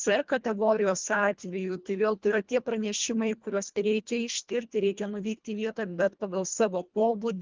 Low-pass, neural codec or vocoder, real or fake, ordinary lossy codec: 7.2 kHz; codec, 24 kHz, 1.5 kbps, HILCodec; fake; Opus, 24 kbps